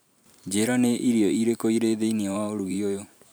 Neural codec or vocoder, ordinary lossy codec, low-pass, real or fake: vocoder, 44.1 kHz, 128 mel bands every 512 samples, BigVGAN v2; none; none; fake